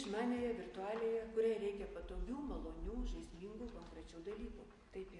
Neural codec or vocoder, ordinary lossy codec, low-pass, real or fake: none; MP3, 48 kbps; 10.8 kHz; real